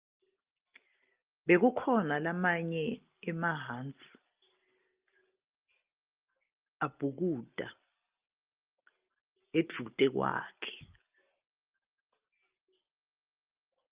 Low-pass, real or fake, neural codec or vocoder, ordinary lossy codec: 3.6 kHz; real; none; Opus, 24 kbps